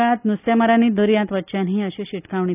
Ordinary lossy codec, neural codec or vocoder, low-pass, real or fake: none; none; 3.6 kHz; real